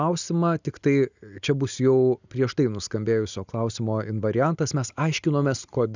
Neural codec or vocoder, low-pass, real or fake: none; 7.2 kHz; real